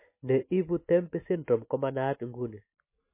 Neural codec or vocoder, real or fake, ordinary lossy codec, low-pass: none; real; MP3, 24 kbps; 3.6 kHz